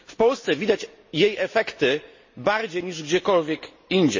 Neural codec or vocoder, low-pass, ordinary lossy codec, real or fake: none; 7.2 kHz; MP3, 32 kbps; real